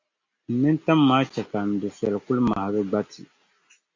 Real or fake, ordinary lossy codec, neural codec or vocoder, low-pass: real; MP3, 64 kbps; none; 7.2 kHz